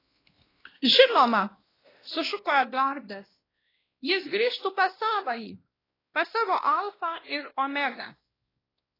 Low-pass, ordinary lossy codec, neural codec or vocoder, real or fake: 5.4 kHz; AAC, 24 kbps; codec, 16 kHz, 1 kbps, X-Codec, WavLM features, trained on Multilingual LibriSpeech; fake